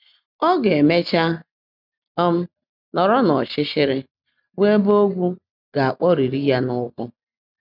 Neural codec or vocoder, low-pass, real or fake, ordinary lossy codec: none; 5.4 kHz; real; none